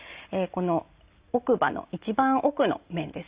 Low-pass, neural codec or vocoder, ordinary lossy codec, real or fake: 3.6 kHz; none; Opus, 64 kbps; real